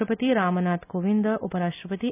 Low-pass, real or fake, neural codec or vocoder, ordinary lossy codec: 3.6 kHz; real; none; none